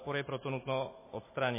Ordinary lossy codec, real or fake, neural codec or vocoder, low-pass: MP3, 16 kbps; real; none; 3.6 kHz